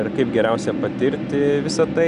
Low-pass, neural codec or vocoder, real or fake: 10.8 kHz; none; real